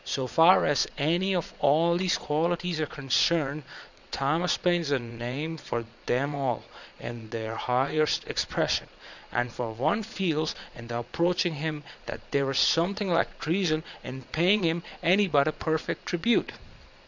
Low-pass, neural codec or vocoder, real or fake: 7.2 kHz; vocoder, 22.05 kHz, 80 mel bands, Vocos; fake